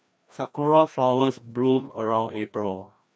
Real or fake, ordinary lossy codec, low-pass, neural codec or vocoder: fake; none; none; codec, 16 kHz, 1 kbps, FreqCodec, larger model